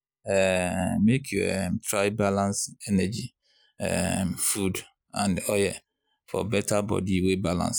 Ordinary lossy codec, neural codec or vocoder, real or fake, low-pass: none; none; real; none